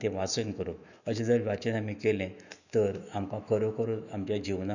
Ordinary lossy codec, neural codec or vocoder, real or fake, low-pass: none; autoencoder, 48 kHz, 128 numbers a frame, DAC-VAE, trained on Japanese speech; fake; 7.2 kHz